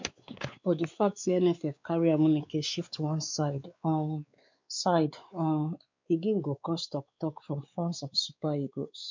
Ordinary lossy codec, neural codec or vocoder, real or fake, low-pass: MP3, 64 kbps; codec, 16 kHz, 4 kbps, X-Codec, WavLM features, trained on Multilingual LibriSpeech; fake; 7.2 kHz